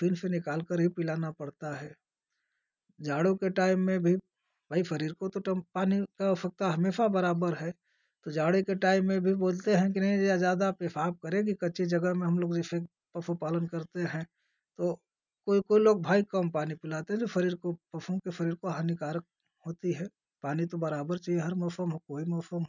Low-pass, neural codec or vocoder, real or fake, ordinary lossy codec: 7.2 kHz; none; real; none